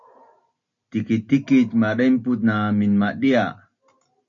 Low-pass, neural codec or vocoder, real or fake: 7.2 kHz; none; real